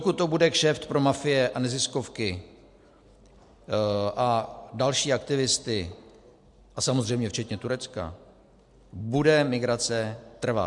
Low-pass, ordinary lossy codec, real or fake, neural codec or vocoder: 10.8 kHz; MP3, 64 kbps; real; none